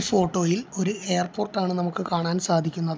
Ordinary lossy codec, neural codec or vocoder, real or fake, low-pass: none; none; real; none